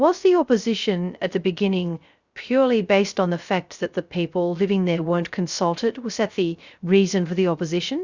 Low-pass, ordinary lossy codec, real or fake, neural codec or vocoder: 7.2 kHz; Opus, 64 kbps; fake; codec, 16 kHz, 0.3 kbps, FocalCodec